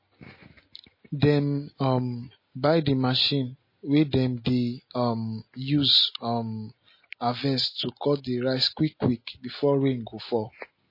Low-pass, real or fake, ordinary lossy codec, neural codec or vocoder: 5.4 kHz; real; MP3, 24 kbps; none